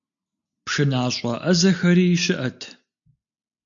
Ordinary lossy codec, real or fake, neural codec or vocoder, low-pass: MP3, 96 kbps; real; none; 7.2 kHz